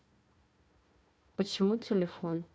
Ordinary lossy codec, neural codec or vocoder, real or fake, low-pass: none; codec, 16 kHz, 1 kbps, FunCodec, trained on Chinese and English, 50 frames a second; fake; none